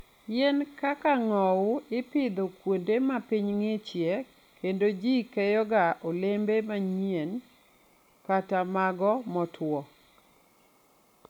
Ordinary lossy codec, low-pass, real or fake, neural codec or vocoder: none; 19.8 kHz; real; none